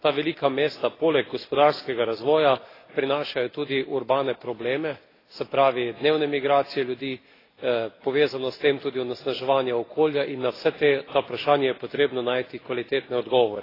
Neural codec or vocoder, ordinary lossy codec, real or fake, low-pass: none; AAC, 24 kbps; real; 5.4 kHz